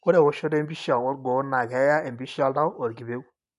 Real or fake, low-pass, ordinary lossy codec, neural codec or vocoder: real; 10.8 kHz; AAC, 96 kbps; none